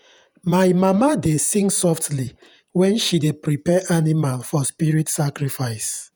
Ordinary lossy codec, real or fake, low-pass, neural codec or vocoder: none; fake; none; vocoder, 48 kHz, 128 mel bands, Vocos